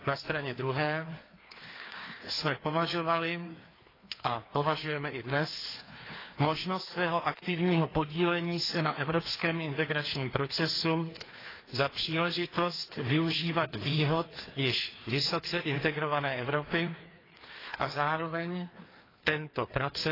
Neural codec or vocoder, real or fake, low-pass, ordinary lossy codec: codec, 16 kHz, 2 kbps, FreqCodec, larger model; fake; 5.4 kHz; AAC, 24 kbps